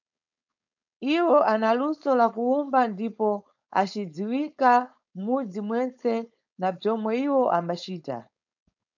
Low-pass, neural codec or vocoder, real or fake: 7.2 kHz; codec, 16 kHz, 4.8 kbps, FACodec; fake